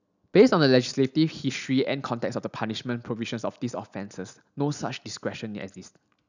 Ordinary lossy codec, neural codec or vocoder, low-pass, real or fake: none; none; 7.2 kHz; real